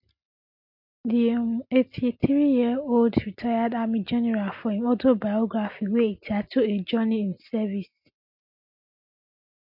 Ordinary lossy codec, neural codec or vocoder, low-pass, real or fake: MP3, 48 kbps; none; 5.4 kHz; real